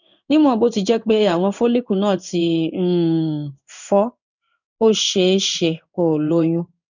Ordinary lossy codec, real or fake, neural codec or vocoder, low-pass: none; fake; codec, 16 kHz in and 24 kHz out, 1 kbps, XY-Tokenizer; 7.2 kHz